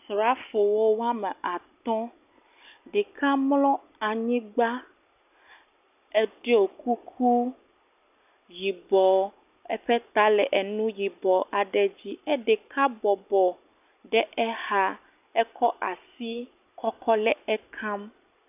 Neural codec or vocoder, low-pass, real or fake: none; 3.6 kHz; real